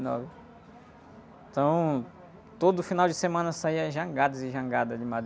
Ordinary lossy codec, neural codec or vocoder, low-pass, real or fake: none; none; none; real